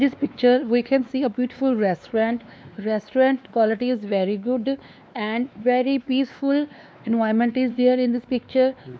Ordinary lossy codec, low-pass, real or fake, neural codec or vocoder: none; none; fake; codec, 16 kHz, 2 kbps, X-Codec, WavLM features, trained on Multilingual LibriSpeech